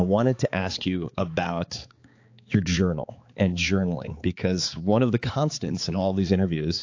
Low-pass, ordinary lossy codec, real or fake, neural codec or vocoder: 7.2 kHz; AAC, 48 kbps; fake; codec, 16 kHz, 4 kbps, X-Codec, HuBERT features, trained on balanced general audio